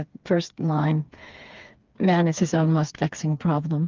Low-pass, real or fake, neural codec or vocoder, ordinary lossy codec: 7.2 kHz; fake; codec, 16 kHz, 4 kbps, FreqCodec, smaller model; Opus, 24 kbps